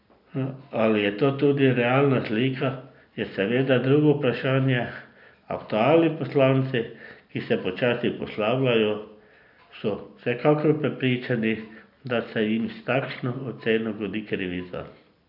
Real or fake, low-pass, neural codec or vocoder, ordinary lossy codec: real; 5.4 kHz; none; none